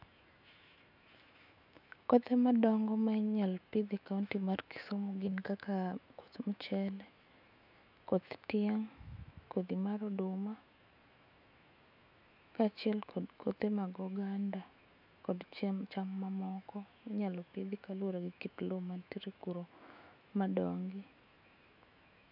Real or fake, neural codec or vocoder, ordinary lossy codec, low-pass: fake; autoencoder, 48 kHz, 128 numbers a frame, DAC-VAE, trained on Japanese speech; none; 5.4 kHz